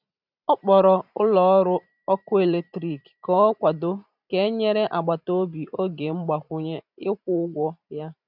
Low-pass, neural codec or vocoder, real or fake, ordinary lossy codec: 5.4 kHz; vocoder, 44.1 kHz, 128 mel bands every 512 samples, BigVGAN v2; fake; none